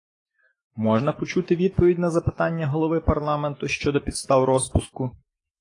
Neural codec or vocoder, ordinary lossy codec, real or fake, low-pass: autoencoder, 48 kHz, 128 numbers a frame, DAC-VAE, trained on Japanese speech; AAC, 32 kbps; fake; 10.8 kHz